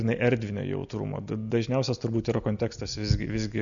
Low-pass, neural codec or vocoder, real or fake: 7.2 kHz; none; real